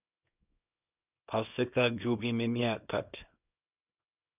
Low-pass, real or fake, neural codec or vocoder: 3.6 kHz; fake; codec, 24 kHz, 0.9 kbps, WavTokenizer, small release